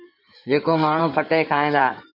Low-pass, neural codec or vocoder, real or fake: 5.4 kHz; codec, 16 kHz in and 24 kHz out, 2.2 kbps, FireRedTTS-2 codec; fake